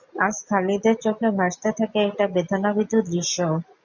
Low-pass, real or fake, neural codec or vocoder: 7.2 kHz; real; none